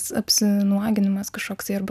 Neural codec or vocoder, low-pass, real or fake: none; 14.4 kHz; real